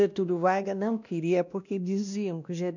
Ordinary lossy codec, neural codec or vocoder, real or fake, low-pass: none; codec, 16 kHz, 1 kbps, X-Codec, WavLM features, trained on Multilingual LibriSpeech; fake; 7.2 kHz